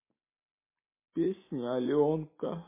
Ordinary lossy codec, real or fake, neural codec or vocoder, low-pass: MP3, 16 kbps; real; none; 3.6 kHz